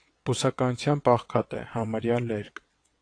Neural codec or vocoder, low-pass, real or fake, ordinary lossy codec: vocoder, 22.05 kHz, 80 mel bands, WaveNeXt; 9.9 kHz; fake; AAC, 48 kbps